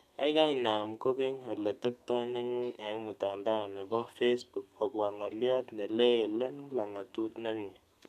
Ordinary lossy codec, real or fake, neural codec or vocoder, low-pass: none; fake; codec, 32 kHz, 1.9 kbps, SNAC; 14.4 kHz